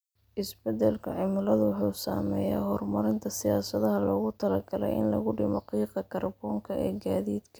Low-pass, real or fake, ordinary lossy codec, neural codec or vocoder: none; real; none; none